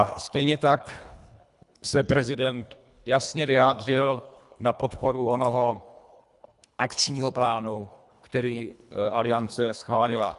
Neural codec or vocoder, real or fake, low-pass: codec, 24 kHz, 1.5 kbps, HILCodec; fake; 10.8 kHz